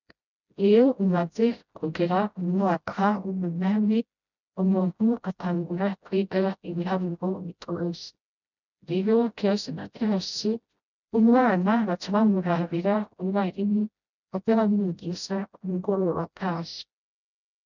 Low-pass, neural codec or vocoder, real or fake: 7.2 kHz; codec, 16 kHz, 0.5 kbps, FreqCodec, smaller model; fake